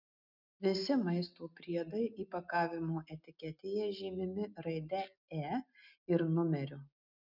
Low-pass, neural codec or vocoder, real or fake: 5.4 kHz; none; real